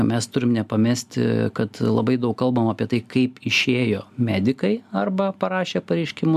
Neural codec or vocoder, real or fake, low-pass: none; real; 14.4 kHz